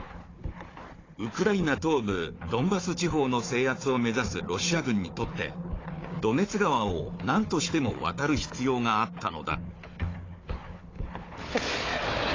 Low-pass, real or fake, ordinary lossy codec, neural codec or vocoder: 7.2 kHz; fake; AAC, 32 kbps; codec, 16 kHz, 4 kbps, FunCodec, trained on Chinese and English, 50 frames a second